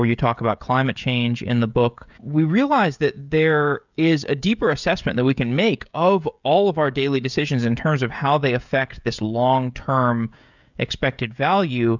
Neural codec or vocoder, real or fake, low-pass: codec, 16 kHz, 16 kbps, FreqCodec, smaller model; fake; 7.2 kHz